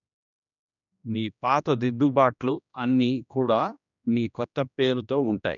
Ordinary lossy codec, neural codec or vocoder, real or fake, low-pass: none; codec, 16 kHz, 1 kbps, X-Codec, HuBERT features, trained on general audio; fake; 7.2 kHz